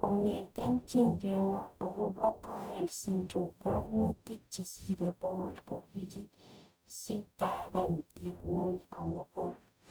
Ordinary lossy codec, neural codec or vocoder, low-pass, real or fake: none; codec, 44.1 kHz, 0.9 kbps, DAC; none; fake